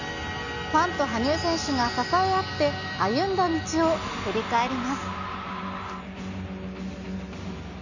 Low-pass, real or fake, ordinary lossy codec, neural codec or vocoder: 7.2 kHz; real; none; none